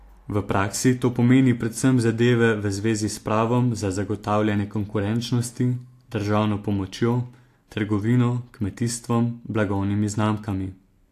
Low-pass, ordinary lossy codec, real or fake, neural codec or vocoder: 14.4 kHz; AAC, 64 kbps; real; none